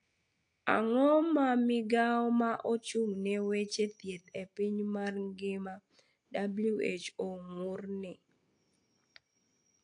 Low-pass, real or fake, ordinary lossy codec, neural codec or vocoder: 9.9 kHz; real; AAC, 48 kbps; none